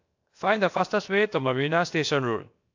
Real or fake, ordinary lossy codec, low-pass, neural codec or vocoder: fake; AAC, 48 kbps; 7.2 kHz; codec, 16 kHz, about 1 kbps, DyCAST, with the encoder's durations